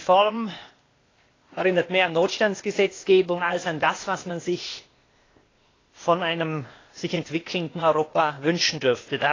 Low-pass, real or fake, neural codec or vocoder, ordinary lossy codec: 7.2 kHz; fake; codec, 16 kHz, 0.8 kbps, ZipCodec; AAC, 32 kbps